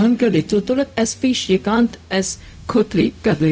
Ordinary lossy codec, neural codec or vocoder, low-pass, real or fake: none; codec, 16 kHz, 0.4 kbps, LongCat-Audio-Codec; none; fake